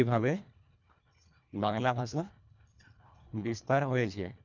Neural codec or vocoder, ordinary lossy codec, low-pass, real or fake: codec, 24 kHz, 1.5 kbps, HILCodec; none; 7.2 kHz; fake